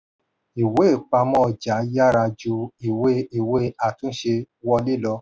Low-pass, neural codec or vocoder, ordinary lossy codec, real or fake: none; none; none; real